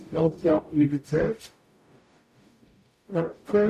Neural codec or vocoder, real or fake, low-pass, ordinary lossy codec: codec, 44.1 kHz, 0.9 kbps, DAC; fake; 14.4 kHz; none